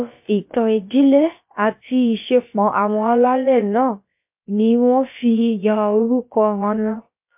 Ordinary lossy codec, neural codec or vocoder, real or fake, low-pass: MP3, 32 kbps; codec, 16 kHz, about 1 kbps, DyCAST, with the encoder's durations; fake; 3.6 kHz